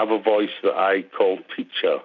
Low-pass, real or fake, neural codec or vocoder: 7.2 kHz; real; none